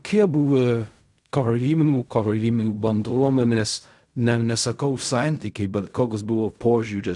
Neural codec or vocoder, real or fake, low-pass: codec, 16 kHz in and 24 kHz out, 0.4 kbps, LongCat-Audio-Codec, fine tuned four codebook decoder; fake; 10.8 kHz